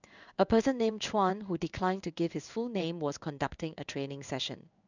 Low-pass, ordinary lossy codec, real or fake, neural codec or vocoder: 7.2 kHz; none; fake; codec, 16 kHz in and 24 kHz out, 1 kbps, XY-Tokenizer